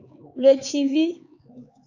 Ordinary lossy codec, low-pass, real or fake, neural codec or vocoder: AAC, 48 kbps; 7.2 kHz; fake; codec, 16 kHz, 4 kbps, X-Codec, HuBERT features, trained on LibriSpeech